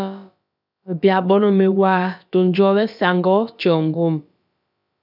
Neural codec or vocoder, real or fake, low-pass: codec, 16 kHz, about 1 kbps, DyCAST, with the encoder's durations; fake; 5.4 kHz